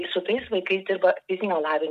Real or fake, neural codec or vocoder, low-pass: real; none; 14.4 kHz